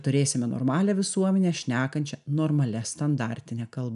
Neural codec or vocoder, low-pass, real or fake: none; 10.8 kHz; real